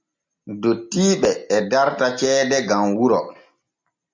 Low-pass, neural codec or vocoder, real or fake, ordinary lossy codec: 7.2 kHz; none; real; MP3, 64 kbps